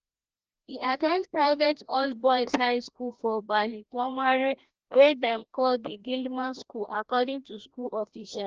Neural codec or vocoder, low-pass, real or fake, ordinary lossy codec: codec, 16 kHz, 1 kbps, FreqCodec, larger model; 7.2 kHz; fake; Opus, 16 kbps